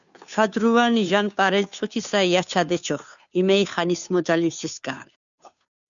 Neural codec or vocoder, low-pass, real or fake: codec, 16 kHz, 2 kbps, FunCodec, trained on Chinese and English, 25 frames a second; 7.2 kHz; fake